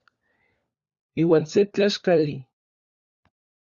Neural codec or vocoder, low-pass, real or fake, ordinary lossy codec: codec, 16 kHz, 4 kbps, FunCodec, trained on LibriTTS, 50 frames a second; 7.2 kHz; fake; Opus, 64 kbps